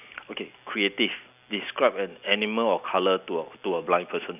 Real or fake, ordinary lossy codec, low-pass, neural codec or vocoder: real; none; 3.6 kHz; none